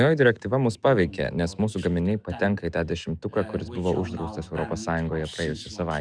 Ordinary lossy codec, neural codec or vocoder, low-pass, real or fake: Opus, 64 kbps; autoencoder, 48 kHz, 128 numbers a frame, DAC-VAE, trained on Japanese speech; 9.9 kHz; fake